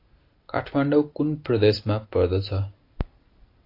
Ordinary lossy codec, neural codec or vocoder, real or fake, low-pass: AAC, 32 kbps; none; real; 5.4 kHz